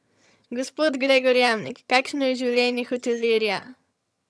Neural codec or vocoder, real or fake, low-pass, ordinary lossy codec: vocoder, 22.05 kHz, 80 mel bands, HiFi-GAN; fake; none; none